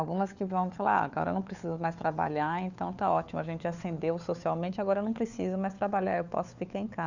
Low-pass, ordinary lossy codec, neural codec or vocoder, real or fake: 7.2 kHz; none; codec, 16 kHz, 4 kbps, FunCodec, trained on LibriTTS, 50 frames a second; fake